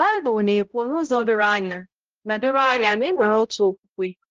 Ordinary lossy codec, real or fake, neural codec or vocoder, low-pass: Opus, 16 kbps; fake; codec, 16 kHz, 0.5 kbps, X-Codec, HuBERT features, trained on balanced general audio; 7.2 kHz